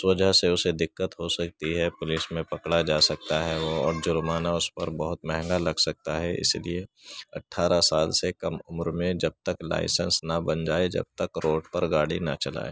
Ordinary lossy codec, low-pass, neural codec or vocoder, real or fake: none; none; none; real